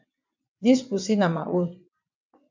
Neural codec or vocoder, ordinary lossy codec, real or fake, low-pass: vocoder, 22.05 kHz, 80 mel bands, WaveNeXt; MP3, 64 kbps; fake; 7.2 kHz